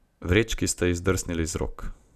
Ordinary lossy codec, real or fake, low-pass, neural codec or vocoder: none; real; 14.4 kHz; none